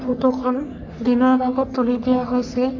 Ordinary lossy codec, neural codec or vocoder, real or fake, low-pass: none; codec, 44.1 kHz, 3.4 kbps, Pupu-Codec; fake; 7.2 kHz